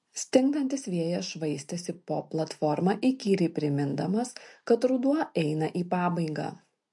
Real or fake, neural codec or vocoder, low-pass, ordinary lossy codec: real; none; 10.8 kHz; MP3, 48 kbps